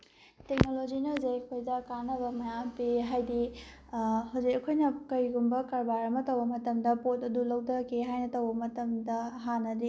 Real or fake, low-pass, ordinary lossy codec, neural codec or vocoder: real; none; none; none